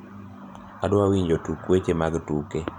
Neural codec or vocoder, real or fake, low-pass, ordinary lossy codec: vocoder, 44.1 kHz, 128 mel bands every 512 samples, BigVGAN v2; fake; 19.8 kHz; none